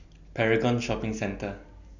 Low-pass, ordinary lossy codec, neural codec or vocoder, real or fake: 7.2 kHz; none; none; real